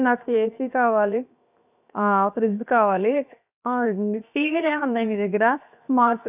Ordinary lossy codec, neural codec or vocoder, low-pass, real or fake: none; codec, 16 kHz, 0.7 kbps, FocalCodec; 3.6 kHz; fake